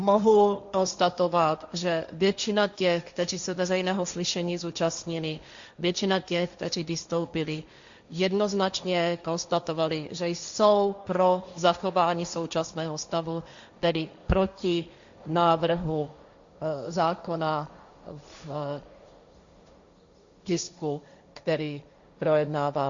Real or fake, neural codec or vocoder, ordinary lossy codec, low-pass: fake; codec, 16 kHz, 1.1 kbps, Voila-Tokenizer; Opus, 64 kbps; 7.2 kHz